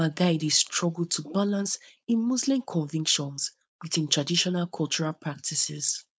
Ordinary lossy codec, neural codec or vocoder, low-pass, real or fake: none; codec, 16 kHz, 4.8 kbps, FACodec; none; fake